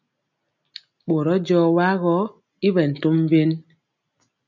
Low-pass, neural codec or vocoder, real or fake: 7.2 kHz; none; real